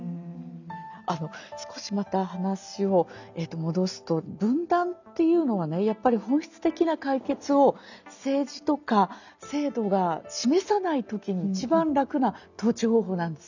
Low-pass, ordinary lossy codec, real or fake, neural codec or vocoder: 7.2 kHz; none; real; none